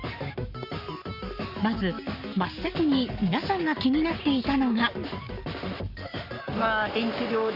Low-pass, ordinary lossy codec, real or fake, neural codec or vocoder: 5.4 kHz; Opus, 64 kbps; fake; codec, 44.1 kHz, 7.8 kbps, Pupu-Codec